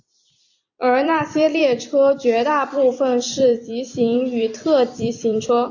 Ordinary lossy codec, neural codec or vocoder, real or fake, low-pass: AAC, 48 kbps; vocoder, 44.1 kHz, 128 mel bands every 256 samples, BigVGAN v2; fake; 7.2 kHz